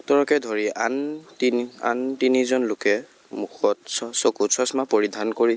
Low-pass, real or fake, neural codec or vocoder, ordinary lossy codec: none; real; none; none